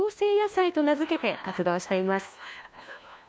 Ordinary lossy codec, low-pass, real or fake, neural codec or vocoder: none; none; fake; codec, 16 kHz, 1 kbps, FunCodec, trained on LibriTTS, 50 frames a second